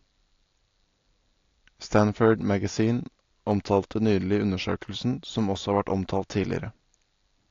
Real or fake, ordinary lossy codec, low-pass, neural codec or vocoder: real; AAC, 48 kbps; 7.2 kHz; none